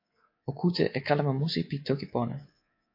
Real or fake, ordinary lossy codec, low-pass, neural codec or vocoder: fake; MP3, 32 kbps; 5.4 kHz; codec, 24 kHz, 3.1 kbps, DualCodec